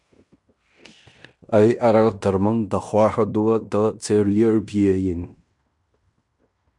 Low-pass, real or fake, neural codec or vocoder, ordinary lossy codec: 10.8 kHz; fake; codec, 16 kHz in and 24 kHz out, 0.9 kbps, LongCat-Audio-Codec, fine tuned four codebook decoder; MP3, 96 kbps